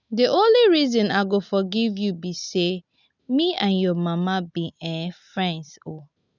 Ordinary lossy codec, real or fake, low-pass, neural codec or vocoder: none; real; 7.2 kHz; none